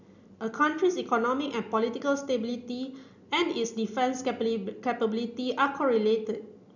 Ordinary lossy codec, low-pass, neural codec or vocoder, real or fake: none; 7.2 kHz; none; real